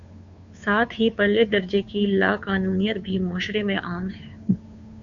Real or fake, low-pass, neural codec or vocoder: fake; 7.2 kHz; codec, 16 kHz, 2 kbps, FunCodec, trained on Chinese and English, 25 frames a second